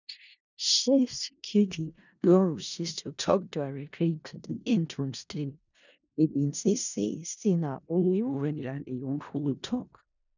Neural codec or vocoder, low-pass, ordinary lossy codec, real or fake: codec, 16 kHz in and 24 kHz out, 0.4 kbps, LongCat-Audio-Codec, four codebook decoder; 7.2 kHz; none; fake